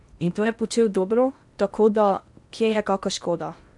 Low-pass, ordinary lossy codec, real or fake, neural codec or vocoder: 10.8 kHz; none; fake; codec, 16 kHz in and 24 kHz out, 0.8 kbps, FocalCodec, streaming, 65536 codes